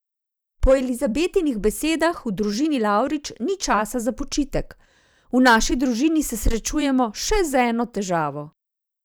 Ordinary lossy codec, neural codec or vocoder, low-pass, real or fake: none; vocoder, 44.1 kHz, 128 mel bands every 512 samples, BigVGAN v2; none; fake